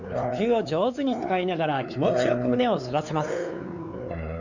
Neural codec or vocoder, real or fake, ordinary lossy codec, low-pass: codec, 16 kHz, 4 kbps, X-Codec, WavLM features, trained on Multilingual LibriSpeech; fake; none; 7.2 kHz